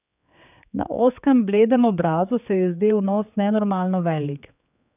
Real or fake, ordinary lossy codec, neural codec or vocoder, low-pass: fake; none; codec, 16 kHz, 4 kbps, X-Codec, HuBERT features, trained on general audio; 3.6 kHz